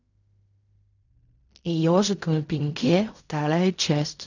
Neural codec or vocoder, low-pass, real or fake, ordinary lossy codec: codec, 16 kHz in and 24 kHz out, 0.4 kbps, LongCat-Audio-Codec, fine tuned four codebook decoder; 7.2 kHz; fake; none